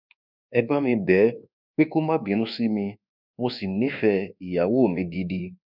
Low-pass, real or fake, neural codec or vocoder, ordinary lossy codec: 5.4 kHz; fake; codec, 24 kHz, 1.2 kbps, DualCodec; none